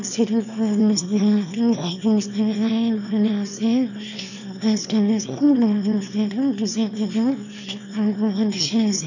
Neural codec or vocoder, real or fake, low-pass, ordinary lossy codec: autoencoder, 22.05 kHz, a latent of 192 numbers a frame, VITS, trained on one speaker; fake; 7.2 kHz; none